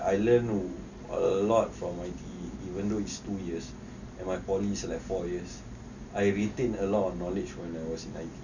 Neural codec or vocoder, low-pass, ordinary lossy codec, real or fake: none; 7.2 kHz; none; real